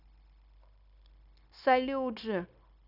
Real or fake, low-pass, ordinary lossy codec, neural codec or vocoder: fake; 5.4 kHz; none; codec, 16 kHz, 0.9 kbps, LongCat-Audio-Codec